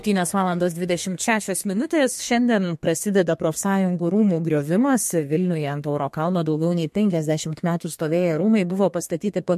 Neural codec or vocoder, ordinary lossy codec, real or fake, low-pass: codec, 32 kHz, 1.9 kbps, SNAC; MP3, 64 kbps; fake; 14.4 kHz